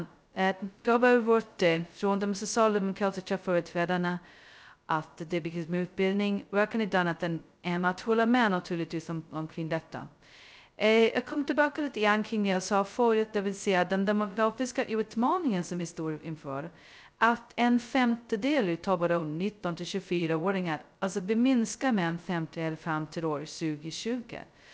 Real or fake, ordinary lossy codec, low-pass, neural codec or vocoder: fake; none; none; codec, 16 kHz, 0.2 kbps, FocalCodec